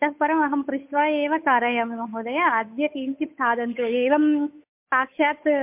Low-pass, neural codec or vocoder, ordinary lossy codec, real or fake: 3.6 kHz; codec, 16 kHz, 8 kbps, FunCodec, trained on Chinese and English, 25 frames a second; MP3, 32 kbps; fake